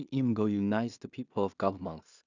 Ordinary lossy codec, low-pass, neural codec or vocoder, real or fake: none; 7.2 kHz; codec, 16 kHz in and 24 kHz out, 0.4 kbps, LongCat-Audio-Codec, two codebook decoder; fake